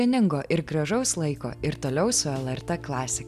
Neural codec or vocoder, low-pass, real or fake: none; 14.4 kHz; real